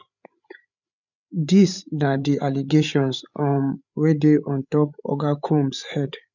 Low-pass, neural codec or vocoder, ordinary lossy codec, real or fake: 7.2 kHz; codec, 16 kHz, 8 kbps, FreqCodec, larger model; none; fake